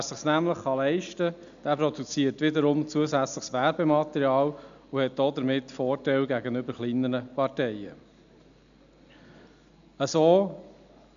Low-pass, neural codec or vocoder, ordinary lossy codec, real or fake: 7.2 kHz; none; none; real